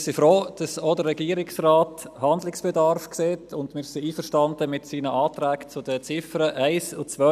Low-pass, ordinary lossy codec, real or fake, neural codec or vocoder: 14.4 kHz; none; real; none